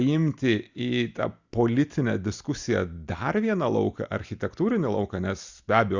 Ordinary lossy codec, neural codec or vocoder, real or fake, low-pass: Opus, 64 kbps; none; real; 7.2 kHz